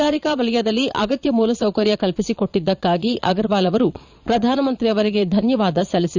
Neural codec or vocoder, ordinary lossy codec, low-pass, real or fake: vocoder, 22.05 kHz, 80 mel bands, Vocos; none; 7.2 kHz; fake